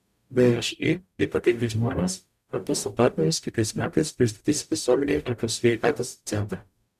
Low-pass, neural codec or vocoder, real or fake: 14.4 kHz; codec, 44.1 kHz, 0.9 kbps, DAC; fake